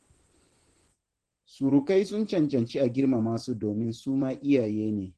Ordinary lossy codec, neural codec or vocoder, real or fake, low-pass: Opus, 16 kbps; vocoder, 24 kHz, 100 mel bands, Vocos; fake; 10.8 kHz